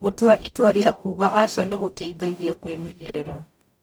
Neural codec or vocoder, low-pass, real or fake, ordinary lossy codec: codec, 44.1 kHz, 0.9 kbps, DAC; none; fake; none